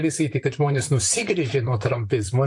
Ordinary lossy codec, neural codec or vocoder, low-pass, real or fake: AAC, 64 kbps; vocoder, 44.1 kHz, 128 mel bands, Pupu-Vocoder; 10.8 kHz; fake